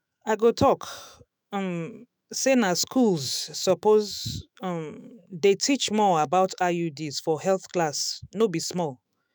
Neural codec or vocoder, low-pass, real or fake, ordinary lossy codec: autoencoder, 48 kHz, 128 numbers a frame, DAC-VAE, trained on Japanese speech; none; fake; none